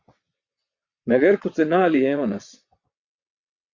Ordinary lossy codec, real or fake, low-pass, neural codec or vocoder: Opus, 64 kbps; fake; 7.2 kHz; vocoder, 22.05 kHz, 80 mel bands, WaveNeXt